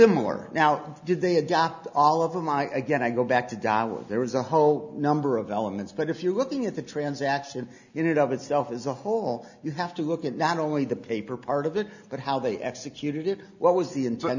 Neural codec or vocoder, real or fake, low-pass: none; real; 7.2 kHz